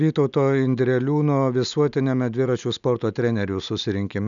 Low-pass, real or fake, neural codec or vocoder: 7.2 kHz; real; none